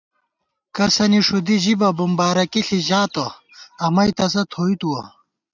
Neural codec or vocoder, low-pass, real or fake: none; 7.2 kHz; real